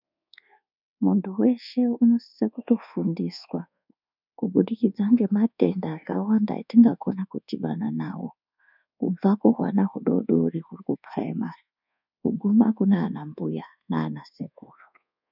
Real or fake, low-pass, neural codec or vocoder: fake; 5.4 kHz; codec, 24 kHz, 1.2 kbps, DualCodec